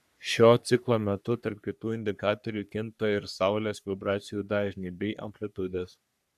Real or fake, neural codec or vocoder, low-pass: fake; codec, 44.1 kHz, 3.4 kbps, Pupu-Codec; 14.4 kHz